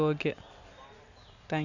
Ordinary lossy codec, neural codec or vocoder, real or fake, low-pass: none; none; real; 7.2 kHz